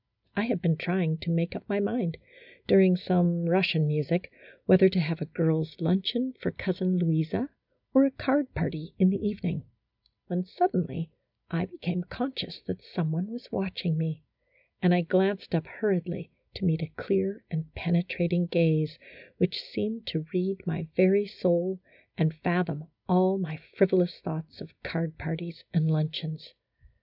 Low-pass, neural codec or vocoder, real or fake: 5.4 kHz; none; real